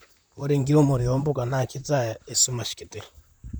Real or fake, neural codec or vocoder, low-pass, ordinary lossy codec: fake; vocoder, 44.1 kHz, 128 mel bands, Pupu-Vocoder; none; none